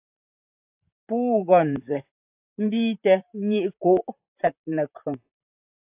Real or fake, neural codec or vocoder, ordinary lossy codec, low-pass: fake; vocoder, 44.1 kHz, 128 mel bands, Pupu-Vocoder; AAC, 32 kbps; 3.6 kHz